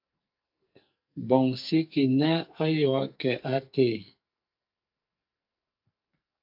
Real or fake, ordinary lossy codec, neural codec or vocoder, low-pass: fake; AAC, 48 kbps; codec, 44.1 kHz, 2.6 kbps, SNAC; 5.4 kHz